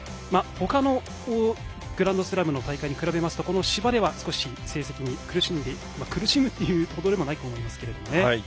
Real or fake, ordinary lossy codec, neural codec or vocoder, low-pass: real; none; none; none